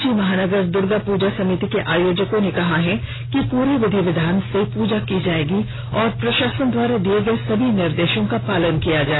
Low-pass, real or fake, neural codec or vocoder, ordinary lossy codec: 7.2 kHz; real; none; AAC, 16 kbps